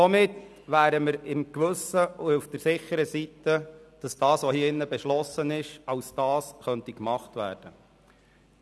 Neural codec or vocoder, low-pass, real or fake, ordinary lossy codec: none; none; real; none